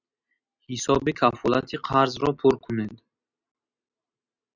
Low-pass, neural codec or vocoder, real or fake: 7.2 kHz; none; real